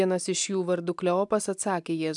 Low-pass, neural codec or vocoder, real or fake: 10.8 kHz; none; real